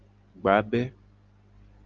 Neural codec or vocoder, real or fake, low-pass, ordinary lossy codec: none; real; 7.2 kHz; Opus, 24 kbps